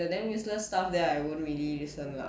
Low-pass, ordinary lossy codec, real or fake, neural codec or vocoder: none; none; real; none